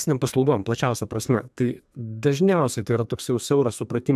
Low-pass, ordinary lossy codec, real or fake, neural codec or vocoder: 14.4 kHz; AAC, 96 kbps; fake; codec, 32 kHz, 1.9 kbps, SNAC